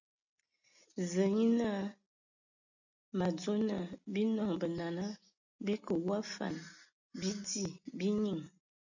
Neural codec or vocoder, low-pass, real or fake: none; 7.2 kHz; real